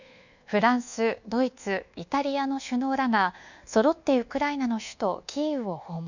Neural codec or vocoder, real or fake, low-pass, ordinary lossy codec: codec, 24 kHz, 1.2 kbps, DualCodec; fake; 7.2 kHz; none